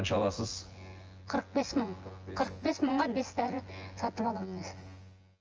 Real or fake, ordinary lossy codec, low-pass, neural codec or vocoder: fake; Opus, 24 kbps; 7.2 kHz; vocoder, 24 kHz, 100 mel bands, Vocos